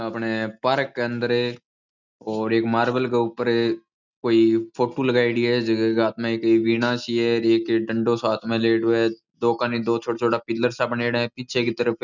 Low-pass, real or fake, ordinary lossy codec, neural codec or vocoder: 7.2 kHz; real; none; none